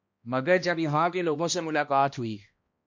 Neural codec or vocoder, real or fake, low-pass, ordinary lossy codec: codec, 16 kHz, 1 kbps, X-Codec, HuBERT features, trained on balanced general audio; fake; 7.2 kHz; MP3, 48 kbps